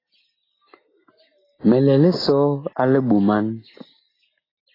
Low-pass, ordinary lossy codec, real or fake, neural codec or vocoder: 5.4 kHz; AAC, 24 kbps; real; none